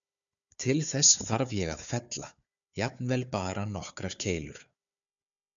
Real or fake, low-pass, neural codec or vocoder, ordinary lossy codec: fake; 7.2 kHz; codec, 16 kHz, 4 kbps, FunCodec, trained on Chinese and English, 50 frames a second; MP3, 96 kbps